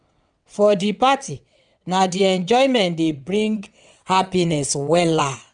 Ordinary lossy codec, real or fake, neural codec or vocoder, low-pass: none; fake; vocoder, 22.05 kHz, 80 mel bands, WaveNeXt; 9.9 kHz